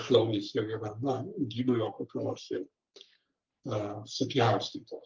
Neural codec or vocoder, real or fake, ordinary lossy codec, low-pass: codec, 44.1 kHz, 3.4 kbps, Pupu-Codec; fake; Opus, 32 kbps; 7.2 kHz